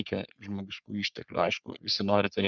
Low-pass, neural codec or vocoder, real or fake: 7.2 kHz; codec, 44.1 kHz, 3.4 kbps, Pupu-Codec; fake